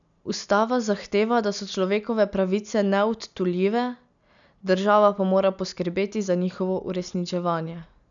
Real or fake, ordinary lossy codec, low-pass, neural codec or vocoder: real; none; 7.2 kHz; none